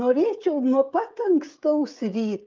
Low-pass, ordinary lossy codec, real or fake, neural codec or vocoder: 7.2 kHz; Opus, 24 kbps; fake; codec, 16 kHz, 16 kbps, FreqCodec, smaller model